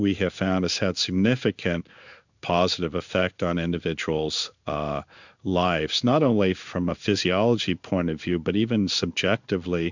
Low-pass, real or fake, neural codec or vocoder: 7.2 kHz; fake; codec, 16 kHz in and 24 kHz out, 1 kbps, XY-Tokenizer